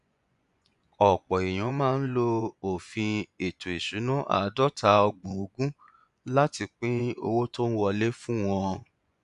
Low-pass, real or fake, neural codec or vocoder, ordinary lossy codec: 10.8 kHz; fake; vocoder, 24 kHz, 100 mel bands, Vocos; none